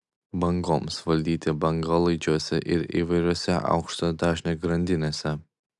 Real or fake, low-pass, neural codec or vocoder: real; 10.8 kHz; none